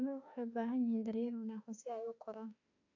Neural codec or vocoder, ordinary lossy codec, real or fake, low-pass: autoencoder, 48 kHz, 32 numbers a frame, DAC-VAE, trained on Japanese speech; AAC, 48 kbps; fake; 7.2 kHz